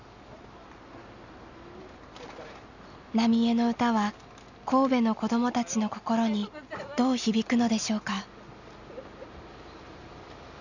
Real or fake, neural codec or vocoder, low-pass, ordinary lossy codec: real; none; 7.2 kHz; none